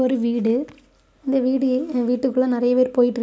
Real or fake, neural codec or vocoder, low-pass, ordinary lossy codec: real; none; none; none